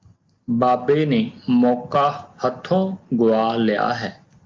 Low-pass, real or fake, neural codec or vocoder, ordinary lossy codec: 7.2 kHz; real; none; Opus, 32 kbps